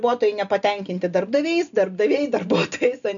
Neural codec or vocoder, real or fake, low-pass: none; real; 7.2 kHz